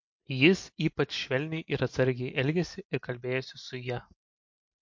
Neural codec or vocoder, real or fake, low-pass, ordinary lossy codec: none; real; 7.2 kHz; MP3, 48 kbps